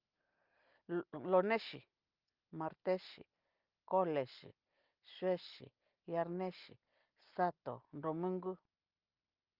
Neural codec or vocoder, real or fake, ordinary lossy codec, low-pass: none; real; Opus, 32 kbps; 5.4 kHz